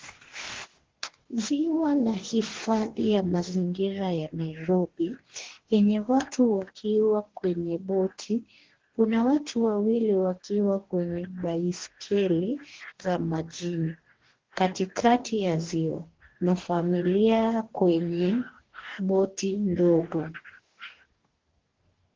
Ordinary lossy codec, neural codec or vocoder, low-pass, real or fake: Opus, 16 kbps; codec, 44.1 kHz, 2.6 kbps, DAC; 7.2 kHz; fake